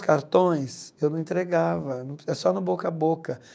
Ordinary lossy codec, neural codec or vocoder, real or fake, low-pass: none; codec, 16 kHz, 6 kbps, DAC; fake; none